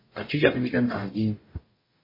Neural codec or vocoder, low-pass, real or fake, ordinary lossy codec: codec, 44.1 kHz, 0.9 kbps, DAC; 5.4 kHz; fake; MP3, 24 kbps